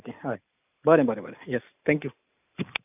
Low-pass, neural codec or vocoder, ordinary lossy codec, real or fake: 3.6 kHz; none; none; real